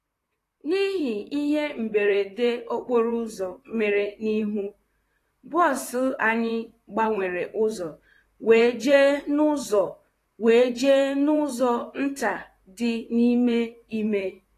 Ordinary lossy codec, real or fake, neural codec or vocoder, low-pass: AAC, 48 kbps; fake; vocoder, 44.1 kHz, 128 mel bands, Pupu-Vocoder; 14.4 kHz